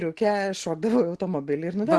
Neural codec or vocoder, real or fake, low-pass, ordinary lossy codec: none; real; 10.8 kHz; Opus, 16 kbps